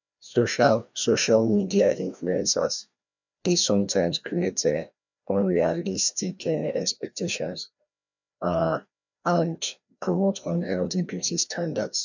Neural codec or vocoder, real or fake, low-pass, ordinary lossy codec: codec, 16 kHz, 1 kbps, FreqCodec, larger model; fake; 7.2 kHz; none